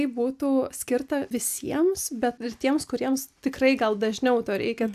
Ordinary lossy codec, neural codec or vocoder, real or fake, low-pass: AAC, 96 kbps; none; real; 14.4 kHz